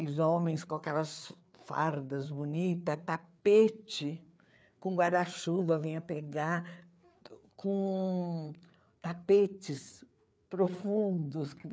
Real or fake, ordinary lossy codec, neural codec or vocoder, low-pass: fake; none; codec, 16 kHz, 4 kbps, FreqCodec, larger model; none